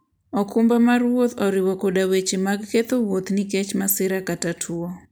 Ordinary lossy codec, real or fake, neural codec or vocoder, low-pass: none; real; none; none